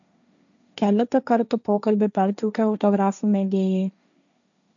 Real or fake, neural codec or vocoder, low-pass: fake; codec, 16 kHz, 1.1 kbps, Voila-Tokenizer; 7.2 kHz